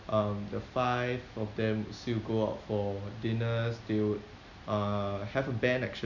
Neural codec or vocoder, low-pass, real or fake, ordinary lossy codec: none; 7.2 kHz; real; none